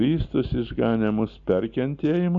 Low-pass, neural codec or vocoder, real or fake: 7.2 kHz; none; real